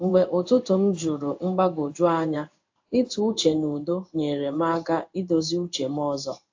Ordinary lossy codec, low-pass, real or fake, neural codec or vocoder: AAC, 48 kbps; 7.2 kHz; fake; codec, 16 kHz in and 24 kHz out, 1 kbps, XY-Tokenizer